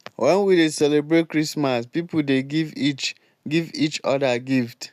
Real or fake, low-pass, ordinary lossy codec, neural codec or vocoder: real; 14.4 kHz; none; none